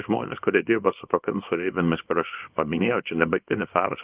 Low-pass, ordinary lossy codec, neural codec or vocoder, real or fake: 3.6 kHz; Opus, 32 kbps; codec, 24 kHz, 0.9 kbps, WavTokenizer, small release; fake